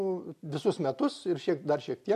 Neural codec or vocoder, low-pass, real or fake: none; 14.4 kHz; real